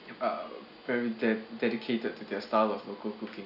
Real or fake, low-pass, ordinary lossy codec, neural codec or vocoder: real; 5.4 kHz; none; none